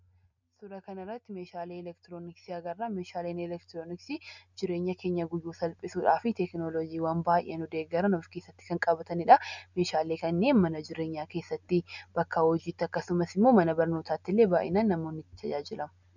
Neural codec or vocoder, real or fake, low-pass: none; real; 7.2 kHz